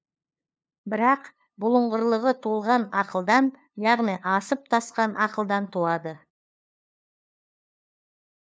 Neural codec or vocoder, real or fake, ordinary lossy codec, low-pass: codec, 16 kHz, 2 kbps, FunCodec, trained on LibriTTS, 25 frames a second; fake; none; none